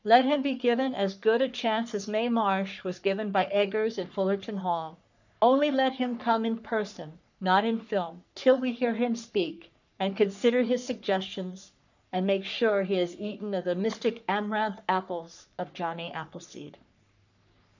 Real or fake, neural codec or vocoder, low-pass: fake; codec, 44.1 kHz, 3.4 kbps, Pupu-Codec; 7.2 kHz